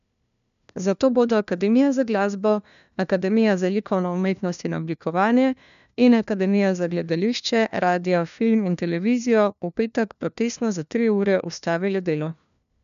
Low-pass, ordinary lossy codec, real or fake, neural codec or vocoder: 7.2 kHz; none; fake; codec, 16 kHz, 1 kbps, FunCodec, trained on LibriTTS, 50 frames a second